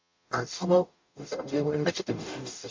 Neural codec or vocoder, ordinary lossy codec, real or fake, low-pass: codec, 44.1 kHz, 0.9 kbps, DAC; MP3, 48 kbps; fake; 7.2 kHz